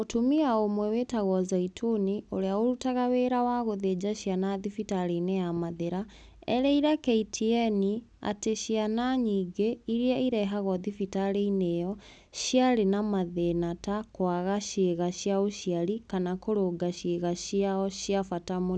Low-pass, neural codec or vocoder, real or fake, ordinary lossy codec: none; none; real; none